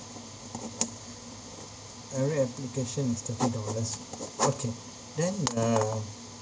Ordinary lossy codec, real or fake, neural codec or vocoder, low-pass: none; real; none; none